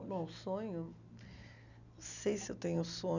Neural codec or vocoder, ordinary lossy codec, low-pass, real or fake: none; none; 7.2 kHz; real